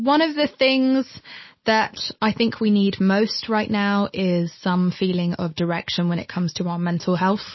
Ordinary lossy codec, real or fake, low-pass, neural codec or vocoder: MP3, 24 kbps; real; 7.2 kHz; none